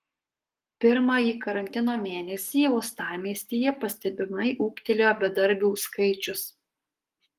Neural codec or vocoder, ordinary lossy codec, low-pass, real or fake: codec, 44.1 kHz, 7.8 kbps, Pupu-Codec; Opus, 24 kbps; 14.4 kHz; fake